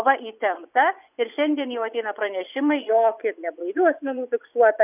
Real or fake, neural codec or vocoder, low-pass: fake; vocoder, 24 kHz, 100 mel bands, Vocos; 3.6 kHz